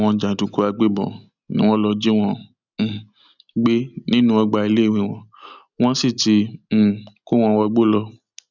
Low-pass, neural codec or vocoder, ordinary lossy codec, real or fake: 7.2 kHz; none; none; real